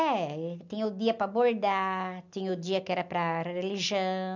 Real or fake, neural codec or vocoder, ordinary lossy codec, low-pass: real; none; none; 7.2 kHz